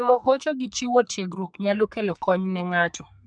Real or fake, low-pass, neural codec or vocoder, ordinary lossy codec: fake; 9.9 kHz; codec, 32 kHz, 1.9 kbps, SNAC; MP3, 96 kbps